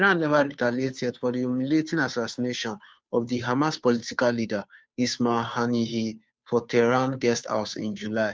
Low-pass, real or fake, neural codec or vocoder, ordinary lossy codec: none; fake; codec, 16 kHz, 2 kbps, FunCodec, trained on Chinese and English, 25 frames a second; none